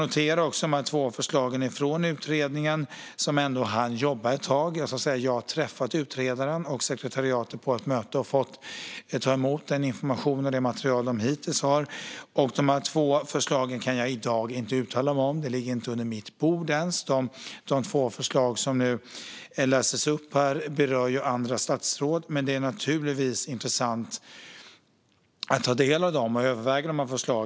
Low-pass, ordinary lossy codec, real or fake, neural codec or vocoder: none; none; real; none